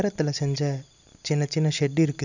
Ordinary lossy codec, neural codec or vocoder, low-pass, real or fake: none; none; 7.2 kHz; real